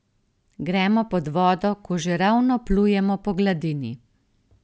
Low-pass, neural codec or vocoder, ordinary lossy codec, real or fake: none; none; none; real